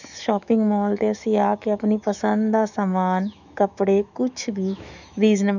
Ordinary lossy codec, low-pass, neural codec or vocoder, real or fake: none; 7.2 kHz; autoencoder, 48 kHz, 128 numbers a frame, DAC-VAE, trained on Japanese speech; fake